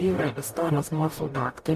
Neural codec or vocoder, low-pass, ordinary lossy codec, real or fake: codec, 44.1 kHz, 0.9 kbps, DAC; 14.4 kHz; AAC, 96 kbps; fake